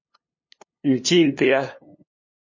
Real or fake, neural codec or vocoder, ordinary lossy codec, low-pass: fake; codec, 16 kHz, 2 kbps, FunCodec, trained on LibriTTS, 25 frames a second; MP3, 32 kbps; 7.2 kHz